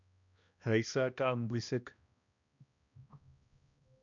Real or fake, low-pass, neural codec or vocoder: fake; 7.2 kHz; codec, 16 kHz, 1 kbps, X-Codec, HuBERT features, trained on balanced general audio